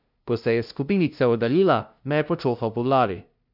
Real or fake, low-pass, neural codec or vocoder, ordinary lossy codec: fake; 5.4 kHz; codec, 16 kHz, 0.5 kbps, FunCodec, trained on LibriTTS, 25 frames a second; none